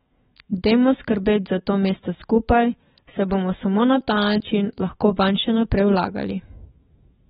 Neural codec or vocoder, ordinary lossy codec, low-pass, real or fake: autoencoder, 48 kHz, 128 numbers a frame, DAC-VAE, trained on Japanese speech; AAC, 16 kbps; 19.8 kHz; fake